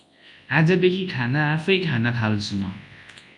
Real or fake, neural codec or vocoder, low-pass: fake; codec, 24 kHz, 0.9 kbps, WavTokenizer, large speech release; 10.8 kHz